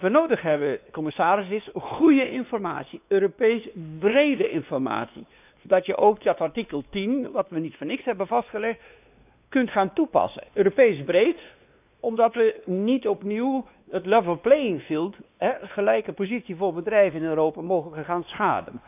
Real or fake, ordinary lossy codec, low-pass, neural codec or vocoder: fake; none; 3.6 kHz; codec, 16 kHz, 2 kbps, X-Codec, WavLM features, trained on Multilingual LibriSpeech